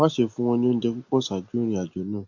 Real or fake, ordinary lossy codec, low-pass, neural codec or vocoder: real; AAC, 48 kbps; 7.2 kHz; none